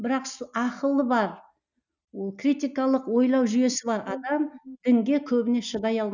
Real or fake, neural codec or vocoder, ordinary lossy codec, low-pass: real; none; none; 7.2 kHz